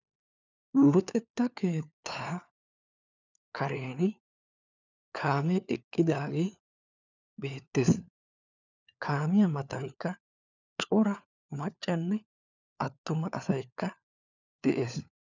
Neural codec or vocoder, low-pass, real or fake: codec, 16 kHz, 4 kbps, FunCodec, trained on LibriTTS, 50 frames a second; 7.2 kHz; fake